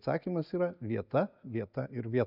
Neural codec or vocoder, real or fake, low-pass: none; real; 5.4 kHz